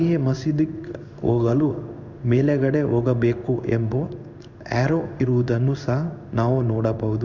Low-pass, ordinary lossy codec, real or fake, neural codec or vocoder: 7.2 kHz; none; fake; codec, 16 kHz in and 24 kHz out, 1 kbps, XY-Tokenizer